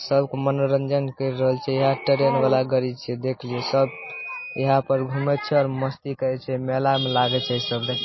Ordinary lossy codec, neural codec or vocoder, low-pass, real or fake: MP3, 24 kbps; none; 7.2 kHz; real